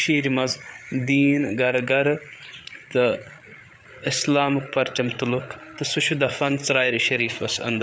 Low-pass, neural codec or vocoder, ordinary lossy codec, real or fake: none; codec, 16 kHz, 8 kbps, FreqCodec, larger model; none; fake